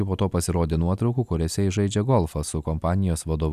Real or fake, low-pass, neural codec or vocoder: real; 14.4 kHz; none